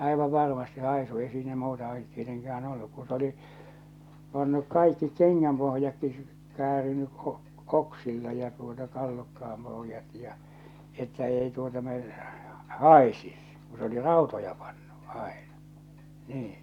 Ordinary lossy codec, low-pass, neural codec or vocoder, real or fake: none; 19.8 kHz; none; real